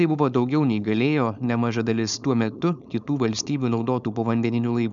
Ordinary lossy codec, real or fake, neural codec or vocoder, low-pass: MP3, 96 kbps; fake; codec, 16 kHz, 4.8 kbps, FACodec; 7.2 kHz